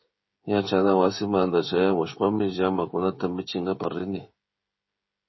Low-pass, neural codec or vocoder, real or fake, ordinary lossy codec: 7.2 kHz; codec, 16 kHz, 16 kbps, FreqCodec, smaller model; fake; MP3, 24 kbps